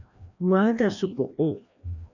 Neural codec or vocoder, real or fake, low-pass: codec, 16 kHz, 1 kbps, FreqCodec, larger model; fake; 7.2 kHz